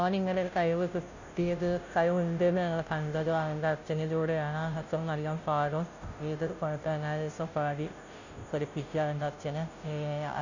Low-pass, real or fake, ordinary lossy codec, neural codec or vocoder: 7.2 kHz; fake; none; codec, 16 kHz, 0.5 kbps, FunCodec, trained on Chinese and English, 25 frames a second